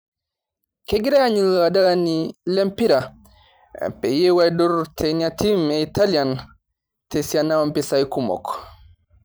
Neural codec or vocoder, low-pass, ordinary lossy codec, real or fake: vocoder, 44.1 kHz, 128 mel bands every 512 samples, BigVGAN v2; none; none; fake